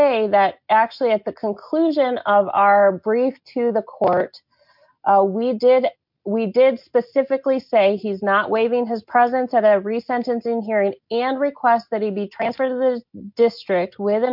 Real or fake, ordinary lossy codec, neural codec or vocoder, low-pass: real; MP3, 48 kbps; none; 5.4 kHz